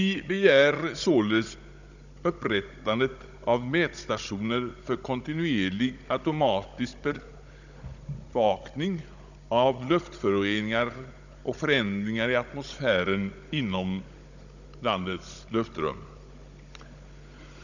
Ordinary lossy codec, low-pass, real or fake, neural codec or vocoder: none; 7.2 kHz; fake; codec, 16 kHz, 16 kbps, FunCodec, trained on Chinese and English, 50 frames a second